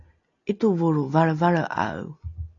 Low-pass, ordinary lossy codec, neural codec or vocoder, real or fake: 7.2 kHz; AAC, 32 kbps; none; real